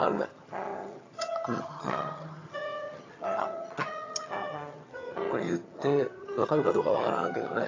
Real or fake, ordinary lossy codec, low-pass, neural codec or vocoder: fake; AAC, 32 kbps; 7.2 kHz; vocoder, 22.05 kHz, 80 mel bands, HiFi-GAN